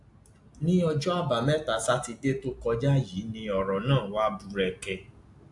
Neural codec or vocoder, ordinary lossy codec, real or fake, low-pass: none; AAC, 64 kbps; real; 10.8 kHz